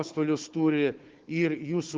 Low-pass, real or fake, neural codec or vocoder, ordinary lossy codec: 7.2 kHz; fake; codec, 16 kHz, 4 kbps, FunCodec, trained on Chinese and English, 50 frames a second; Opus, 16 kbps